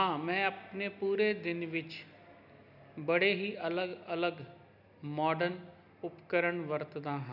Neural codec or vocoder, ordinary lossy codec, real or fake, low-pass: none; none; real; 5.4 kHz